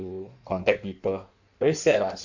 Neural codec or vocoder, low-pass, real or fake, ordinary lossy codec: codec, 16 kHz in and 24 kHz out, 1.1 kbps, FireRedTTS-2 codec; 7.2 kHz; fake; none